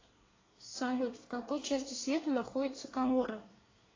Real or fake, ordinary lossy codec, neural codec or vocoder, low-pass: fake; AAC, 32 kbps; codec, 24 kHz, 1 kbps, SNAC; 7.2 kHz